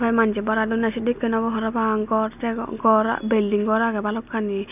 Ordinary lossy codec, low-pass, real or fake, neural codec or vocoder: none; 3.6 kHz; real; none